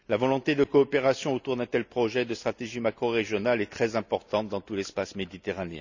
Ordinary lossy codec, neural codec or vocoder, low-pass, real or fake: none; none; 7.2 kHz; real